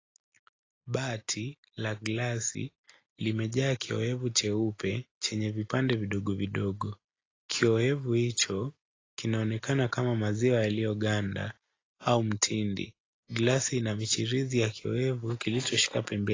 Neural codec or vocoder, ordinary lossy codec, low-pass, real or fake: none; AAC, 32 kbps; 7.2 kHz; real